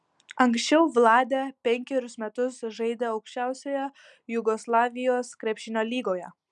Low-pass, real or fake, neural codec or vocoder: 10.8 kHz; real; none